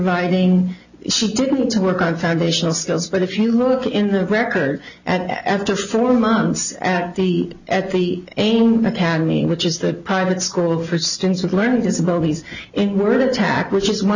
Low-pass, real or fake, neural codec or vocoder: 7.2 kHz; real; none